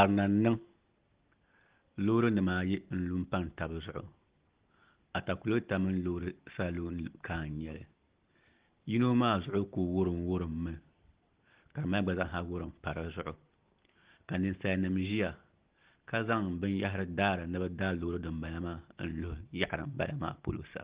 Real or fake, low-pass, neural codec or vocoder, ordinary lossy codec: real; 3.6 kHz; none; Opus, 16 kbps